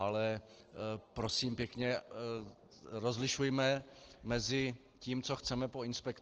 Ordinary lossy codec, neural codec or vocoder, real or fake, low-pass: Opus, 16 kbps; none; real; 7.2 kHz